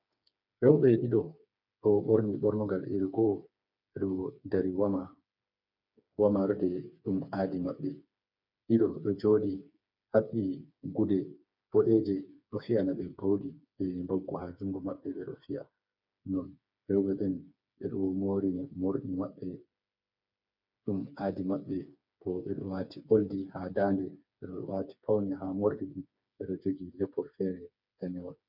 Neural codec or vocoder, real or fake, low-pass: codec, 16 kHz, 4 kbps, FreqCodec, smaller model; fake; 5.4 kHz